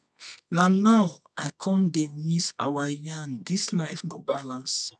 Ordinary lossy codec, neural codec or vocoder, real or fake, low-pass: none; codec, 24 kHz, 0.9 kbps, WavTokenizer, medium music audio release; fake; 10.8 kHz